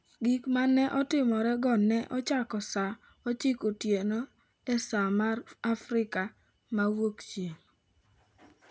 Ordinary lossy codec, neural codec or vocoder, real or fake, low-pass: none; none; real; none